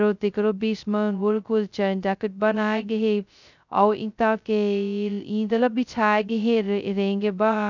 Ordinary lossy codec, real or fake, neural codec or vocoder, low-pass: none; fake; codec, 16 kHz, 0.2 kbps, FocalCodec; 7.2 kHz